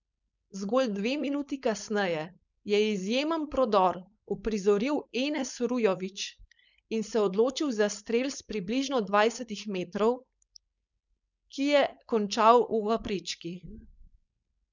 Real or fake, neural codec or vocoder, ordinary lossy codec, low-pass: fake; codec, 16 kHz, 4.8 kbps, FACodec; none; 7.2 kHz